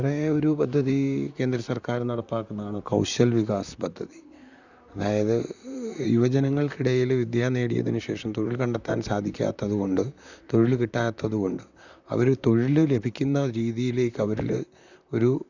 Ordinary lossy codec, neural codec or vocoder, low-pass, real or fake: none; vocoder, 44.1 kHz, 128 mel bands, Pupu-Vocoder; 7.2 kHz; fake